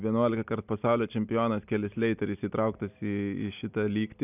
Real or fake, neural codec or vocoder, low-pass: real; none; 3.6 kHz